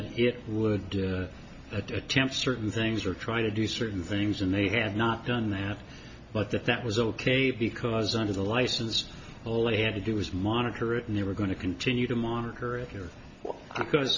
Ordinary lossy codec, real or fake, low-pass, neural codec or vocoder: MP3, 48 kbps; real; 7.2 kHz; none